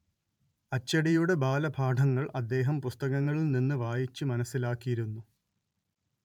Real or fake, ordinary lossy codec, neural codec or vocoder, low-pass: fake; none; vocoder, 44.1 kHz, 128 mel bands every 512 samples, BigVGAN v2; 19.8 kHz